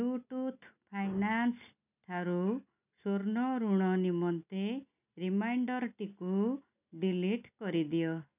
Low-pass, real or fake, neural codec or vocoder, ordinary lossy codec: 3.6 kHz; real; none; none